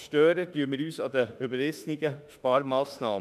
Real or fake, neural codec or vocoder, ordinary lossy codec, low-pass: fake; autoencoder, 48 kHz, 32 numbers a frame, DAC-VAE, trained on Japanese speech; none; 14.4 kHz